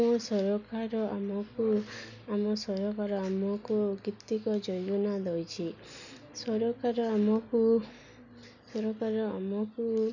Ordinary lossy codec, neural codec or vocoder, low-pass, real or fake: none; none; 7.2 kHz; real